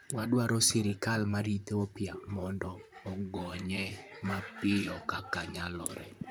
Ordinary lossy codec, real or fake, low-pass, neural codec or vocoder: none; fake; none; vocoder, 44.1 kHz, 128 mel bands, Pupu-Vocoder